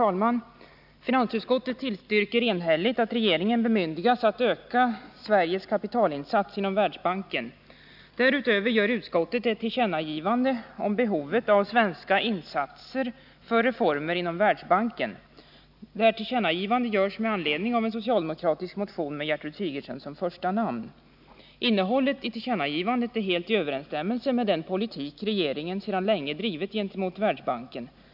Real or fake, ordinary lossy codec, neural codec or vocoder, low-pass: real; none; none; 5.4 kHz